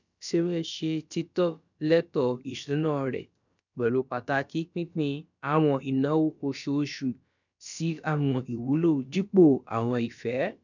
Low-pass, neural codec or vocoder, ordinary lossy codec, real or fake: 7.2 kHz; codec, 16 kHz, about 1 kbps, DyCAST, with the encoder's durations; none; fake